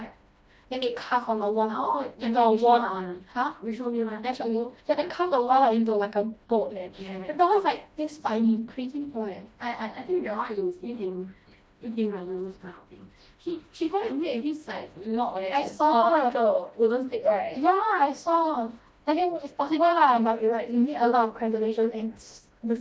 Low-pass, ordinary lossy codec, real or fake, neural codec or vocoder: none; none; fake; codec, 16 kHz, 1 kbps, FreqCodec, smaller model